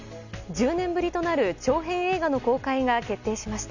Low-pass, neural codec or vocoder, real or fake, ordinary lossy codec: 7.2 kHz; none; real; none